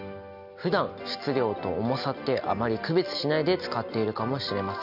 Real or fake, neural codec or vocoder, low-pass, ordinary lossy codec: real; none; 5.4 kHz; none